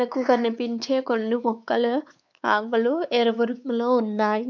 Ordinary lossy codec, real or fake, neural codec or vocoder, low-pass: none; fake; codec, 16 kHz, 4 kbps, X-Codec, WavLM features, trained on Multilingual LibriSpeech; 7.2 kHz